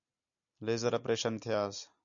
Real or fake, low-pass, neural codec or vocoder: real; 7.2 kHz; none